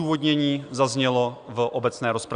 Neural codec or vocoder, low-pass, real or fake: none; 9.9 kHz; real